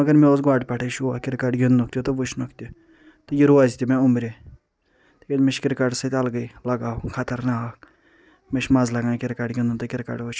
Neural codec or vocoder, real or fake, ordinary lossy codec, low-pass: none; real; none; none